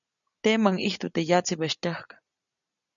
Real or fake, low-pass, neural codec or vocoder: real; 7.2 kHz; none